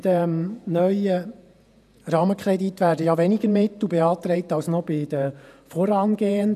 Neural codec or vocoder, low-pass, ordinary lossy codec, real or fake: vocoder, 48 kHz, 128 mel bands, Vocos; 14.4 kHz; none; fake